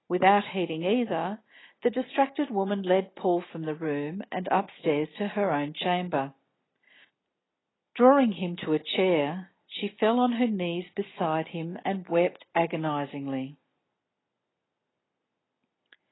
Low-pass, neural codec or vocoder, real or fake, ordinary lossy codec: 7.2 kHz; none; real; AAC, 16 kbps